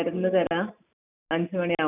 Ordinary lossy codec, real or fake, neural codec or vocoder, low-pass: none; real; none; 3.6 kHz